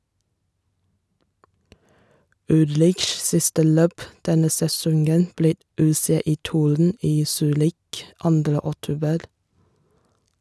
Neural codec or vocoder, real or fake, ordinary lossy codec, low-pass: none; real; none; none